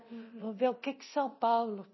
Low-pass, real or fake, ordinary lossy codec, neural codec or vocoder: 7.2 kHz; fake; MP3, 24 kbps; codec, 24 kHz, 0.9 kbps, DualCodec